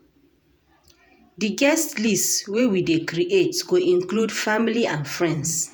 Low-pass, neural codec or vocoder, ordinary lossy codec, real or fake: none; vocoder, 48 kHz, 128 mel bands, Vocos; none; fake